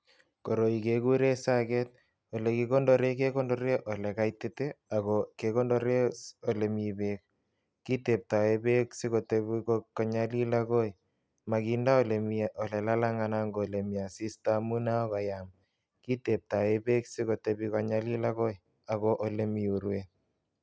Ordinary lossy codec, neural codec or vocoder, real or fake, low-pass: none; none; real; none